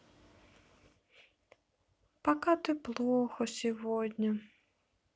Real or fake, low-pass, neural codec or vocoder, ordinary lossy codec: real; none; none; none